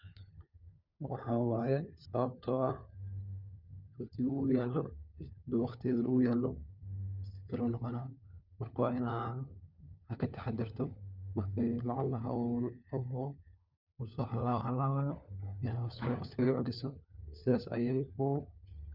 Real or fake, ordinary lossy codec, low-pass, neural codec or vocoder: fake; none; 5.4 kHz; codec, 16 kHz, 4 kbps, FunCodec, trained on LibriTTS, 50 frames a second